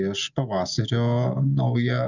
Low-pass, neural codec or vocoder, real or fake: 7.2 kHz; none; real